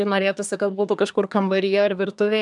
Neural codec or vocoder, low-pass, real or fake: codec, 24 kHz, 1 kbps, SNAC; 10.8 kHz; fake